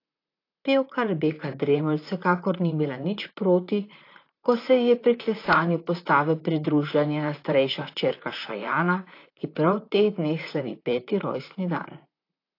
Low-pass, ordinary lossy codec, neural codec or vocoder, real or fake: 5.4 kHz; AAC, 32 kbps; vocoder, 44.1 kHz, 128 mel bands, Pupu-Vocoder; fake